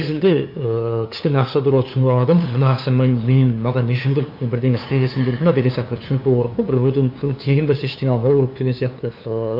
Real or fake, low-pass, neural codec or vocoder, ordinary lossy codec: fake; 5.4 kHz; codec, 16 kHz, 2 kbps, FunCodec, trained on LibriTTS, 25 frames a second; AAC, 48 kbps